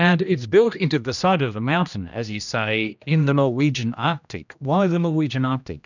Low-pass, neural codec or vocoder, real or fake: 7.2 kHz; codec, 16 kHz, 1 kbps, X-Codec, HuBERT features, trained on general audio; fake